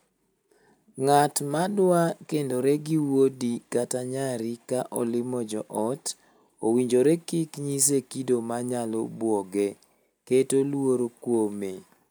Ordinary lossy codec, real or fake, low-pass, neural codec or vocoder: none; real; none; none